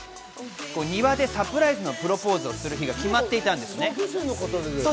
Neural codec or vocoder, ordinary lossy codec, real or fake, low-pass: none; none; real; none